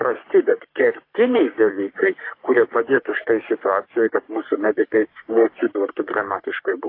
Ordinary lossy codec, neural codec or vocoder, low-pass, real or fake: AAC, 32 kbps; codec, 44.1 kHz, 3.4 kbps, Pupu-Codec; 5.4 kHz; fake